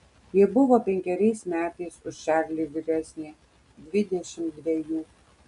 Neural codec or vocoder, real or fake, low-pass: none; real; 10.8 kHz